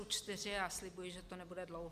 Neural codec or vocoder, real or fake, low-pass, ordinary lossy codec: none; real; 14.4 kHz; Opus, 64 kbps